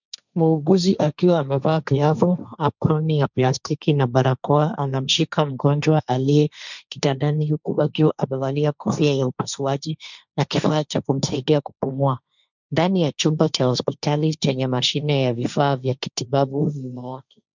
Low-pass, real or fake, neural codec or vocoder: 7.2 kHz; fake; codec, 16 kHz, 1.1 kbps, Voila-Tokenizer